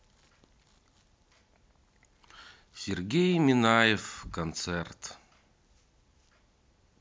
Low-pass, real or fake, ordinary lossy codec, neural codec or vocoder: none; real; none; none